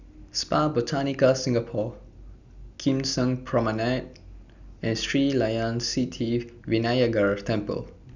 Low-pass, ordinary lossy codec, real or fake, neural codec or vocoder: 7.2 kHz; none; real; none